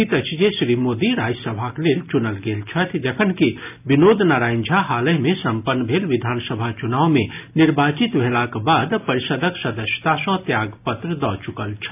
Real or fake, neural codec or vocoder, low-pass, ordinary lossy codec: real; none; 3.6 kHz; AAC, 32 kbps